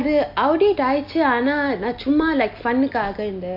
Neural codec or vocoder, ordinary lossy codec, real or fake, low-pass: none; MP3, 32 kbps; real; 5.4 kHz